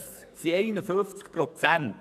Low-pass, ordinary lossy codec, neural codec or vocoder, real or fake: 14.4 kHz; none; codec, 44.1 kHz, 2.6 kbps, SNAC; fake